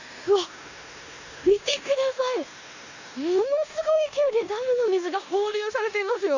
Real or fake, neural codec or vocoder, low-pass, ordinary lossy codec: fake; codec, 16 kHz in and 24 kHz out, 0.9 kbps, LongCat-Audio-Codec, four codebook decoder; 7.2 kHz; none